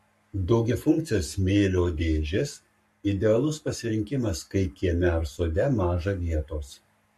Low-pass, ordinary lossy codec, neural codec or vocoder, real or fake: 14.4 kHz; MP3, 64 kbps; codec, 44.1 kHz, 7.8 kbps, Pupu-Codec; fake